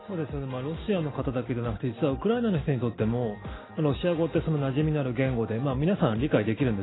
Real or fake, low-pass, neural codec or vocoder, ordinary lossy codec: real; 7.2 kHz; none; AAC, 16 kbps